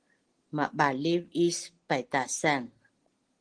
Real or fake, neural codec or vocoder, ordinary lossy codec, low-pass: real; none; Opus, 16 kbps; 9.9 kHz